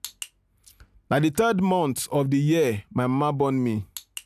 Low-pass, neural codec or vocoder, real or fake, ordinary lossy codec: 14.4 kHz; none; real; none